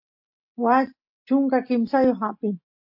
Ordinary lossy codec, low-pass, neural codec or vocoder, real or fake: MP3, 32 kbps; 5.4 kHz; none; real